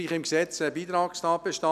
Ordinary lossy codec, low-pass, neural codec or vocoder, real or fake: none; 14.4 kHz; none; real